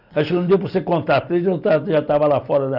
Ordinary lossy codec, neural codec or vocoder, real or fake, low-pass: none; none; real; 5.4 kHz